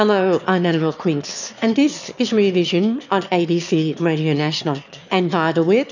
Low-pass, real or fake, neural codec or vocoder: 7.2 kHz; fake; autoencoder, 22.05 kHz, a latent of 192 numbers a frame, VITS, trained on one speaker